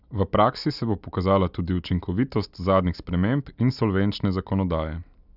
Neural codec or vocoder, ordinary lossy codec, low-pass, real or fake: none; none; 5.4 kHz; real